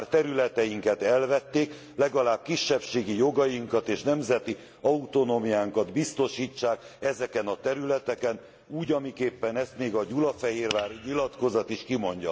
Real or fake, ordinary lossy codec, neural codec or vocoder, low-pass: real; none; none; none